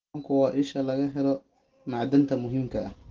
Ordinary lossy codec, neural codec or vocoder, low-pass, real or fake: Opus, 16 kbps; none; 7.2 kHz; real